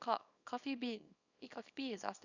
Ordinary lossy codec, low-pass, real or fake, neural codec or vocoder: none; 7.2 kHz; fake; codec, 24 kHz, 0.9 kbps, WavTokenizer, small release